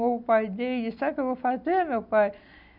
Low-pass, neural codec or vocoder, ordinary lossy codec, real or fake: 5.4 kHz; none; none; real